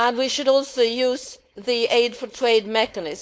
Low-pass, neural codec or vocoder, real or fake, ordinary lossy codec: none; codec, 16 kHz, 4.8 kbps, FACodec; fake; none